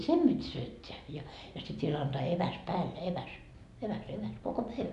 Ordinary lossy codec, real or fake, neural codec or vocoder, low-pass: none; real; none; 10.8 kHz